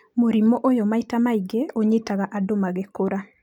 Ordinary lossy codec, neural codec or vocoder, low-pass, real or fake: none; none; 19.8 kHz; real